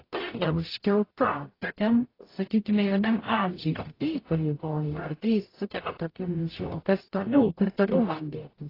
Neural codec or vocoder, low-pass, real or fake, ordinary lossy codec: codec, 44.1 kHz, 0.9 kbps, DAC; 5.4 kHz; fake; AAC, 24 kbps